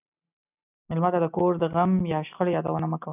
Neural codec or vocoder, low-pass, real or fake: none; 3.6 kHz; real